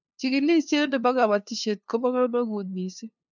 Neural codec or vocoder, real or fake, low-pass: codec, 16 kHz, 2 kbps, FunCodec, trained on LibriTTS, 25 frames a second; fake; 7.2 kHz